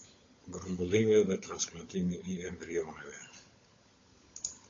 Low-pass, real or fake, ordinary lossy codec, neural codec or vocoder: 7.2 kHz; fake; AAC, 32 kbps; codec, 16 kHz, 16 kbps, FunCodec, trained on LibriTTS, 50 frames a second